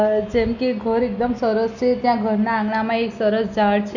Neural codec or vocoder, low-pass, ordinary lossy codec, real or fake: none; 7.2 kHz; none; real